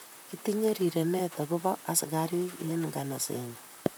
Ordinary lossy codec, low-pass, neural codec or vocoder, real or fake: none; none; vocoder, 44.1 kHz, 128 mel bands, Pupu-Vocoder; fake